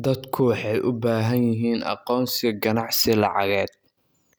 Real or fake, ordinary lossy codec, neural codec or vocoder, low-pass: real; none; none; none